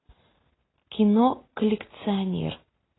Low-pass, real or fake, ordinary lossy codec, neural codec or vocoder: 7.2 kHz; real; AAC, 16 kbps; none